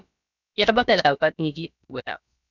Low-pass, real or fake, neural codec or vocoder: 7.2 kHz; fake; codec, 16 kHz, about 1 kbps, DyCAST, with the encoder's durations